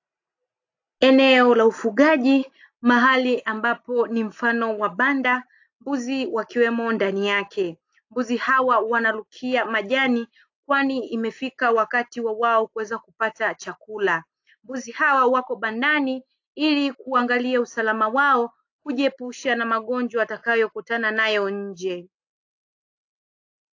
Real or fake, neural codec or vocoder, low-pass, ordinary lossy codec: real; none; 7.2 kHz; AAC, 48 kbps